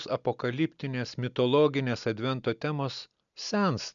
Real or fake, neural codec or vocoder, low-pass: real; none; 7.2 kHz